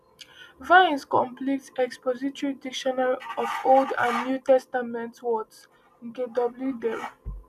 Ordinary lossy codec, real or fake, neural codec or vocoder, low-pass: none; real; none; 14.4 kHz